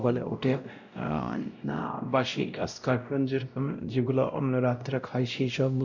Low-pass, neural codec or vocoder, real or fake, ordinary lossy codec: 7.2 kHz; codec, 16 kHz, 0.5 kbps, X-Codec, WavLM features, trained on Multilingual LibriSpeech; fake; none